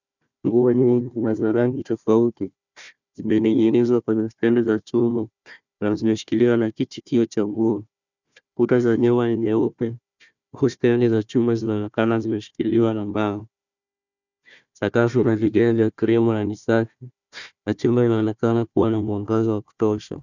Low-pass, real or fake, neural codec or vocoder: 7.2 kHz; fake; codec, 16 kHz, 1 kbps, FunCodec, trained on Chinese and English, 50 frames a second